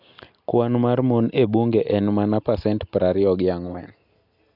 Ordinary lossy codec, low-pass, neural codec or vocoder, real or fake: none; 5.4 kHz; none; real